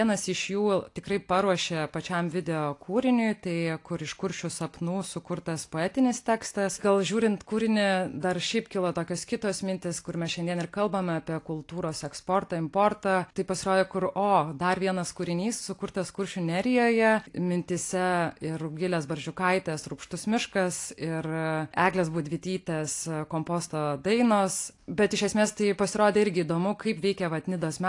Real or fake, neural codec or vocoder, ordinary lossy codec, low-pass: real; none; AAC, 48 kbps; 10.8 kHz